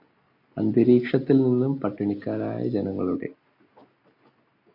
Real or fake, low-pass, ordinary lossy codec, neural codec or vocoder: real; 5.4 kHz; MP3, 32 kbps; none